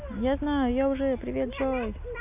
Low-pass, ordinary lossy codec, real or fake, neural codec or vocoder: 3.6 kHz; none; real; none